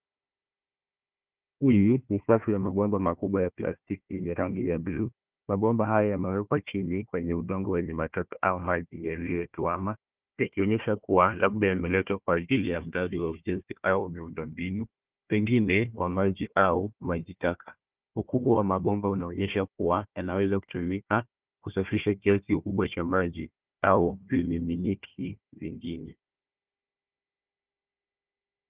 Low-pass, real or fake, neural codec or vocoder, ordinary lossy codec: 3.6 kHz; fake; codec, 16 kHz, 1 kbps, FunCodec, trained on Chinese and English, 50 frames a second; Opus, 32 kbps